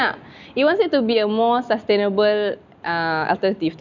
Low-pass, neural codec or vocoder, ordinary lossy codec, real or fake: 7.2 kHz; none; none; real